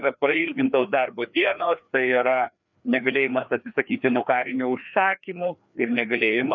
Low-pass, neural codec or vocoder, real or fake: 7.2 kHz; codec, 16 kHz, 2 kbps, FreqCodec, larger model; fake